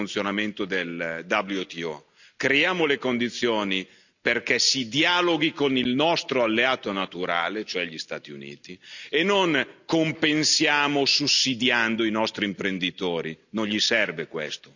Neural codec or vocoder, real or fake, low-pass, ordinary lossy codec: none; real; 7.2 kHz; none